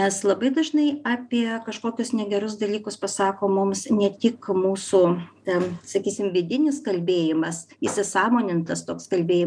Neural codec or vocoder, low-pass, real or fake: none; 9.9 kHz; real